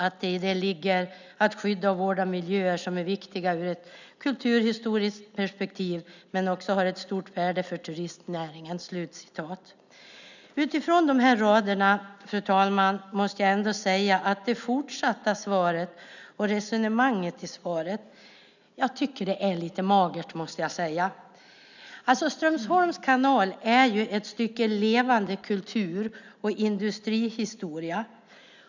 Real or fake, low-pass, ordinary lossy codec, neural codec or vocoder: real; 7.2 kHz; none; none